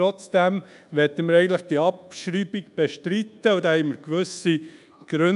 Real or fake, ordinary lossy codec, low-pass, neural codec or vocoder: fake; none; 10.8 kHz; codec, 24 kHz, 1.2 kbps, DualCodec